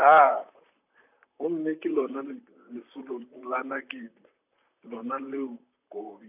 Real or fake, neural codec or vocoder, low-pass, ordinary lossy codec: fake; vocoder, 44.1 kHz, 128 mel bands, Pupu-Vocoder; 3.6 kHz; none